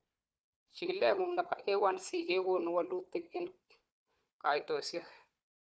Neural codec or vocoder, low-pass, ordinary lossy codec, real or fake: codec, 16 kHz, 4 kbps, FunCodec, trained on Chinese and English, 50 frames a second; none; none; fake